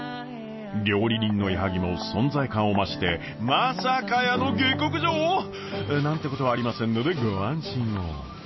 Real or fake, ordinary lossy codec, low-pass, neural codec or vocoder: real; MP3, 24 kbps; 7.2 kHz; none